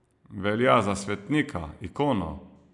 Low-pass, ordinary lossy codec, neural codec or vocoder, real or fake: 10.8 kHz; none; none; real